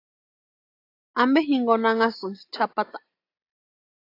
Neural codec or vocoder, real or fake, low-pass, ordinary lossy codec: none; real; 5.4 kHz; AAC, 32 kbps